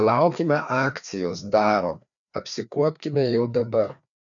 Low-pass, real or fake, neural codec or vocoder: 7.2 kHz; fake; codec, 16 kHz, 2 kbps, FreqCodec, larger model